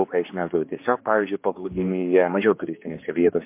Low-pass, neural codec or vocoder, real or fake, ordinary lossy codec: 3.6 kHz; codec, 16 kHz, 2 kbps, X-Codec, HuBERT features, trained on general audio; fake; MP3, 24 kbps